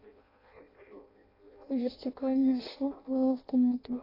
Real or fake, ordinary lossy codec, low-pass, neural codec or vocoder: fake; AAC, 24 kbps; 5.4 kHz; codec, 16 kHz in and 24 kHz out, 0.6 kbps, FireRedTTS-2 codec